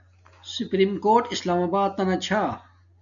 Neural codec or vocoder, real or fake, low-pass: none; real; 7.2 kHz